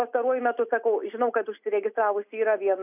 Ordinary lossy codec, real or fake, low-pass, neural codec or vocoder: AAC, 32 kbps; real; 3.6 kHz; none